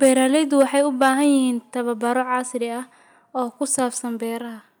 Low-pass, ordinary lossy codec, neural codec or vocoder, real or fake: none; none; none; real